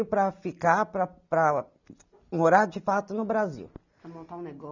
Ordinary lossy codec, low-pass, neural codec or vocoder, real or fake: none; 7.2 kHz; none; real